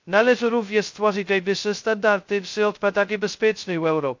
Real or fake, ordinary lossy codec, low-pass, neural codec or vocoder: fake; MP3, 48 kbps; 7.2 kHz; codec, 16 kHz, 0.2 kbps, FocalCodec